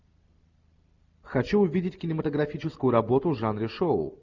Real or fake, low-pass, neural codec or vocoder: real; 7.2 kHz; none